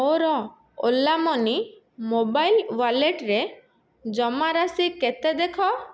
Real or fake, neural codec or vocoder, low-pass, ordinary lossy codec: real; none; none; none